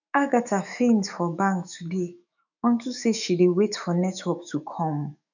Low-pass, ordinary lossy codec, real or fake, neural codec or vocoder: 7.2 kHz; none; fake; autoencoder, 48 kHz, 128 numbers a frame, DAC-VAE, trained on Japanese speech